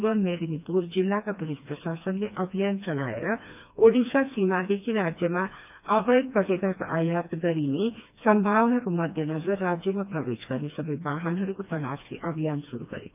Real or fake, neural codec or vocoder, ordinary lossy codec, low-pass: fake; codec, 16 kHz, 2 kbps, FreqCodec, smaller model; none; 3.6 kHz